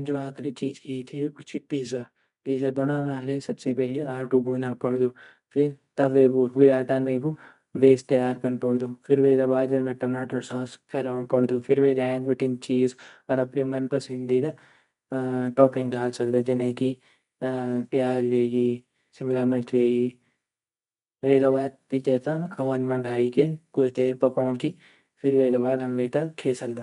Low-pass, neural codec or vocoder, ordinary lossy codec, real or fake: 10.8 kHz; codec, 24 kHz, 0.9 kbps, WavTokenizer, medium music audio release; MP3, 64 kbps; fake